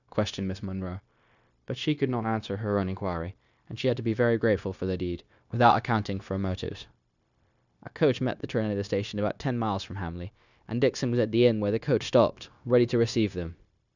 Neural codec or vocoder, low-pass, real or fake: codec, 16 kHz, 0.9 kbps, LongCat-Audio-Codec; 7.2 kHz; fake